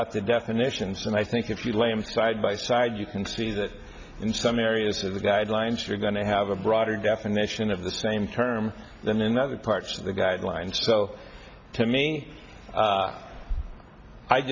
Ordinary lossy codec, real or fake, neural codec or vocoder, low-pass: MP3, 64 kbps; real; none; 7.2 kHz